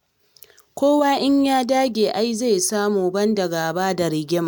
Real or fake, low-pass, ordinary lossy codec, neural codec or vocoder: real; none; none; none